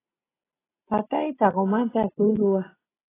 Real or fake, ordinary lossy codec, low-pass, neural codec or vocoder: real; AAC, 16 kbps; 3.6 kHz; none